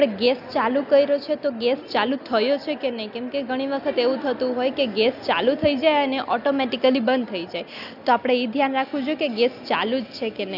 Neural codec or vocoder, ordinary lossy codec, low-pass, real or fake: none; none; 5.4 kHz; real